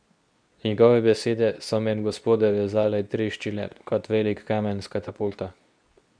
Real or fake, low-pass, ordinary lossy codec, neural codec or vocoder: fake; 9.9 kHz; none; codec, 24 kHz, 0.9 kbps, WavTokenizer, medium speech release version 2